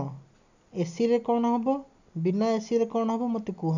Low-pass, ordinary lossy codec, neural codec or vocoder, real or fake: 7.2 kHz; none; none; real